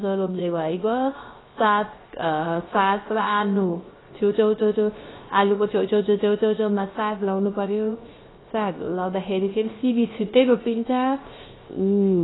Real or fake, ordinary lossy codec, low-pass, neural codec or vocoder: fake; AAC, 16 kbps; 7.2 kHz; codec, 16 kHz, 0.3 kbps, FocalCodec